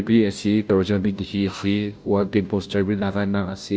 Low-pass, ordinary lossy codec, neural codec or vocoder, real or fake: none; none; codec, 16 kHz, 0.5 kbps, FunCodec, trained on Chinese and English, 25 frames a second; fake